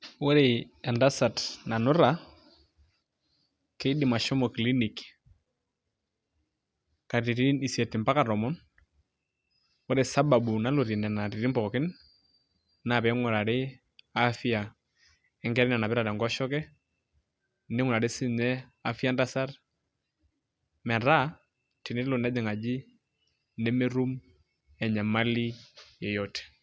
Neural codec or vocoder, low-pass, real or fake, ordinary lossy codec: none; none; real; none